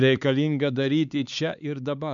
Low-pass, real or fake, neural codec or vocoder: 7.2 kHz; fake; codec, 16 kHz, 4 kbps, X-Codec, HuBERT features, trained on LibriSpeech